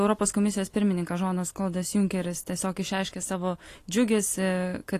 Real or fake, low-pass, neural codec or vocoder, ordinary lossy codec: real; 14.4 kHz; none; AAC, 48 kbps